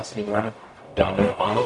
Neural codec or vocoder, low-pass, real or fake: codec, 44.1 kHz, 0.9 kbps, DAC; 10.8 kHz; fake